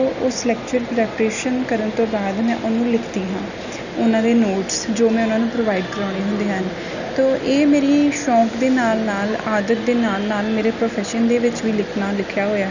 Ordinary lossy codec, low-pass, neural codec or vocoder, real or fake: none; 7.2 kHz; none; real